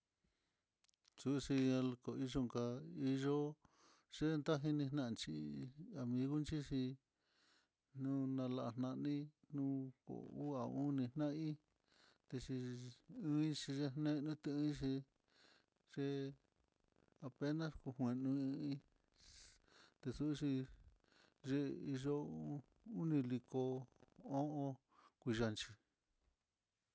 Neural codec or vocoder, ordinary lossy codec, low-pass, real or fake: none; none; none; real